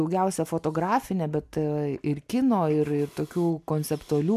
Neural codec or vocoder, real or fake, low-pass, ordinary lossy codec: none; real; 14.4 kHz; MP3, 96 kbps